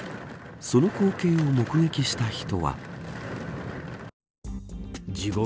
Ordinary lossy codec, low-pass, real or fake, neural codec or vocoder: none; none; real; none